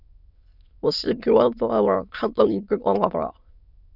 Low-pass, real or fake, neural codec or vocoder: 5.4 kHz; fake; autoencoder, 22.05 kHz, a latent of 192 numbers a frame, VITS, trained on many speakers